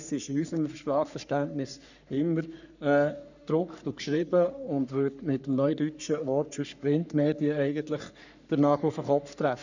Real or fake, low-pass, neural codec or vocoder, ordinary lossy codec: fake; 7.2 kHz; codec, 44.1 kHz, 3.4 kbps, Pupu-Codec; none